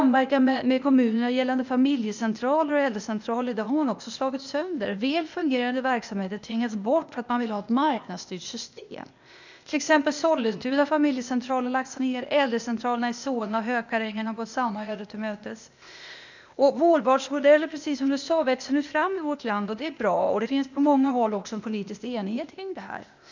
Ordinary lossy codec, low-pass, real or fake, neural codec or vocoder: none; 7.2 kHz; fake; codec, 16 kHz, 0.8 kbps, ZipCodec